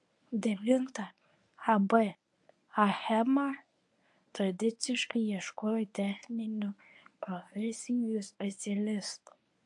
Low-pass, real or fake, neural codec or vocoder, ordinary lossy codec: 10.8 kHz; fake; codec, 24 kHz, 0.9 kbps, WavTokenizer, medium speech release version 1; AAC, 64 kbps